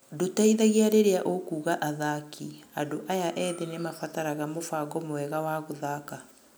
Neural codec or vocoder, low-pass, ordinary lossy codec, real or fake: none; none; none; real